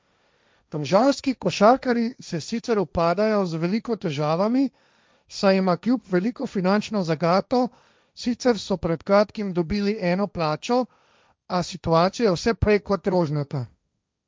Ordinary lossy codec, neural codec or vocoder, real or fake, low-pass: none; codec, 16 kHz, 1.1 kbps, Voila-Tokenizer; fake; none